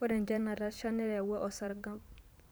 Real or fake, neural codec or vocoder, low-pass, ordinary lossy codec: fake; vocoder, 44.1 kHz, 128 mel bands every 512 samples, BigVGAN v2; none; none